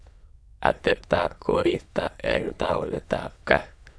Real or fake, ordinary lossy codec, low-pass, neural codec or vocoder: fake; none; none; autoencoder, 22.05 kHz, a latent of 192 numbers a frame, VITS, trained on many speakers